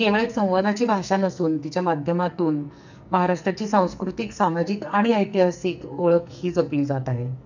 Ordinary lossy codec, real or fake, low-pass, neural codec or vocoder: none; fake; 7.2 kHz; codec, 44.1 kHz, 2.6 kbps, SNAC